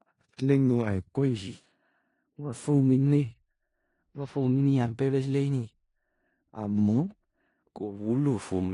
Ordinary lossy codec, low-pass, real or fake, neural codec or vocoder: AAC, 48 kbps; 10.8 kHz; fake; codec, 16 kHz in and 24 kHz out, 0.4 kbps, LongCat-Audio-Codec, four codebook decoder